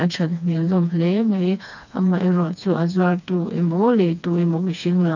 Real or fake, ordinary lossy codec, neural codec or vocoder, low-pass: fake; none; codec, 16 kHz, 2 kbps, FreqCodec, smaller model; 7.2 kHz